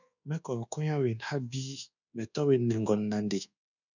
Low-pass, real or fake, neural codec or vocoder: 7.2 kHz; fake; codec, 24 kHz, 1.2 kbps, DualCodec